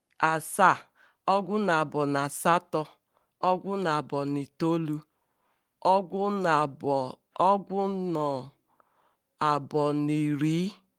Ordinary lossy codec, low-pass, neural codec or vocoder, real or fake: Opus, 24 kbps; 19.8 kHz; codec, 44.1 kHz, 7.8 kbps, Pupu-Codec; fake